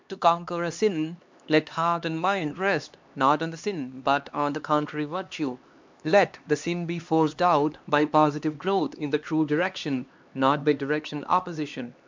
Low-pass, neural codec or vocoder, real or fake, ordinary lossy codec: 7.2 kHz; codec, 16 kHz, 2 kbps, X-Codec, HuBERT features, trained on LibriSpeech; fake; MP3, 64 kbps